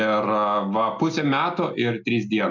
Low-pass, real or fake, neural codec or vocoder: 7.2 kHz; real; none